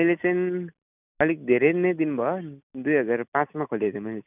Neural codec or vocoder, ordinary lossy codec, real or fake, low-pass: none; none; real; 3.6 kHz